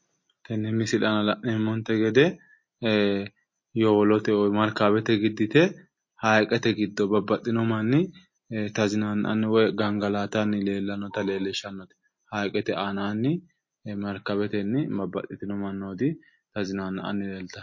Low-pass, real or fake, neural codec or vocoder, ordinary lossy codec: 7.2 kHz; real; none; MP3, 32 kbps